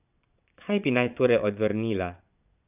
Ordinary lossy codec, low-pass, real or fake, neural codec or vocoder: none; 3.6 kHz; fake; vocoder, 22.05 kHz, 80 mel bands, Vocos